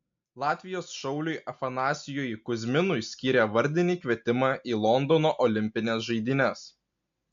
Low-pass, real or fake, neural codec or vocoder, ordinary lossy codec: 7.2 kHz; real; none; AAC, 64 kbps